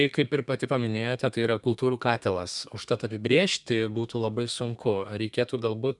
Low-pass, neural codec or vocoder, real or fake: 10.8 kHz; codec, 32 kHz, 1.9 kbps, SNAC; fake